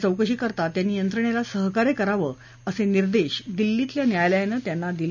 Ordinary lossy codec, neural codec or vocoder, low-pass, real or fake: none; none; 7.2 kHz; real